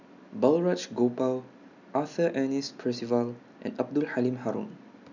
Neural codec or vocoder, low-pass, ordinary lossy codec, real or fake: none; 7.2 kHz; none; real